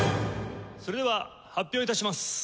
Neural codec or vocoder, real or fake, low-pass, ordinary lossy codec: none; real; none; none